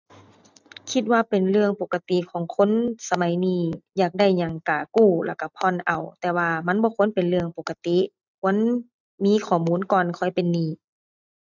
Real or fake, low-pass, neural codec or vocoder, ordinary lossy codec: real; 7.2 kHz; none; none